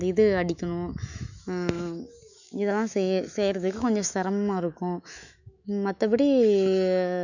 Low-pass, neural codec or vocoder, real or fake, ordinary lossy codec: 7.2 kHz; autoencoder, 48 kHz, 128 numbers a frame, DAC-VAE, trained on Japanese speech; fake; none